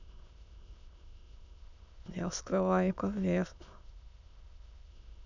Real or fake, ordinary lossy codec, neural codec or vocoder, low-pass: fake; none; autoencoder, 22.05 kHz, a latent of 192 numbers a frame, VITS, trained on many speakers; 7.2 kHz